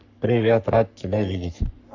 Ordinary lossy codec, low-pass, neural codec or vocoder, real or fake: none; 7.2 kHz; codec, 44.1 kHz, 3.4 kbps, Pupu-Codec; fake